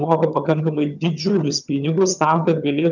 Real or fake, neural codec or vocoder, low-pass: fake; vocoder, 22.05 kHz, 80 mel bands, HiFi-GAN; 7.2 kHz